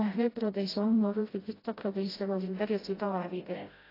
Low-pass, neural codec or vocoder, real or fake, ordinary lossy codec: 5.4 kHz; codec, 16 kHz, 0.5 kbps, FreqCodec, smaller model; fake; AAC, 24 kbps